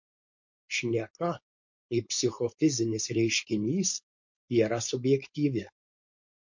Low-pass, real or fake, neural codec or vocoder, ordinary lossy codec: 7.2 kHz; fake; codec, 16 kHz, 4.8 kbps, FACodec; MP3, 48 kbps